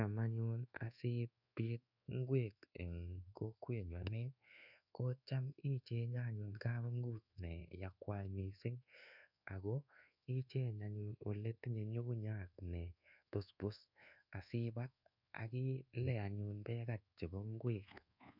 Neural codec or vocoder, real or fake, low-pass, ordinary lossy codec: codec, 24 kHz, 1.2 kbps, DualCodec; fake; 5.4 kHz; none